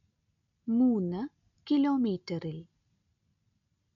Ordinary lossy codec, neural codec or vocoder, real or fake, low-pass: none; none; real; 7.2 kHz